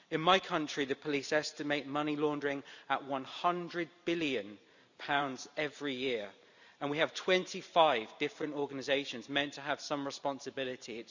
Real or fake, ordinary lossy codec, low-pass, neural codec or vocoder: fake; none; 7.2 kHz; vocoder, 44.1 kHz, 128 mel bands every 512 samples, BigVGAN v2